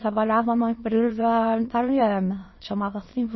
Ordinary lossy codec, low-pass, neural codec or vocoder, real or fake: MP3, 24 kbps; 7.2 kHz; autoencoder, 22.05 kHz, a latent of 192 numbers a frame, VITS, trained on many speakers; fake